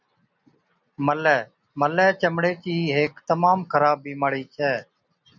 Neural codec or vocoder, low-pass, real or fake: none; 7.2 kHz; real